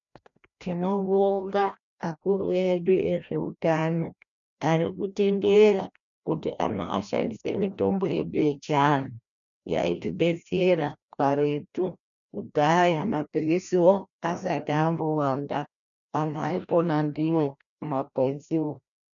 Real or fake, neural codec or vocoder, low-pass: fake; codec, 16 kHz, 1 kbps, FreqCodec, larger model; 7.2 kHz